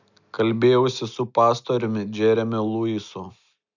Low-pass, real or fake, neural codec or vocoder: 7.2 kHz; real; none